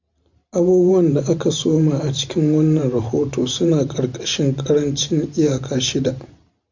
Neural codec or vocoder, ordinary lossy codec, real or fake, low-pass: vocoder, 44.1 kHz, 128 mel bands every 512 samples, BigVGAN v2; MP3, 64 kbps; fake; 7.2 kHz